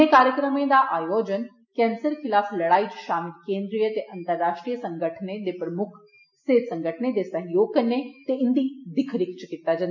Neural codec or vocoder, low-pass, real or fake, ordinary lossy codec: none; 7.2 kHz; real; none